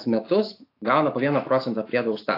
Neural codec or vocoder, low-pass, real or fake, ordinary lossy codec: autoencoder, 48 kHz, 128 numbers a frame, DAC-VAE, trained on Japanese speech; 5.4 kHz; fake; AAC, 32 kbps